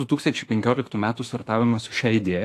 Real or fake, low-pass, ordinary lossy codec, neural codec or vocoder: fake; 14.4 kHz; AAC, 64 kbps; autoencoder, 48 kHz, 32 numbers a frame, DAC-VAE, trained on Japanese speech